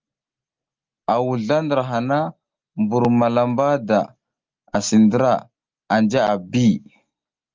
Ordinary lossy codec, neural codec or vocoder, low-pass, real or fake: Opus, 32 kbps; none; 7.2 kHz; real